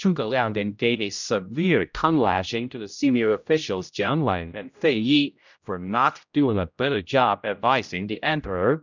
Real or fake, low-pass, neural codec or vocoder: fake; 7.2 kHz; codec, 16 kHz, 0.5 kbps, X-Codec, HuBERT features, trained on general audio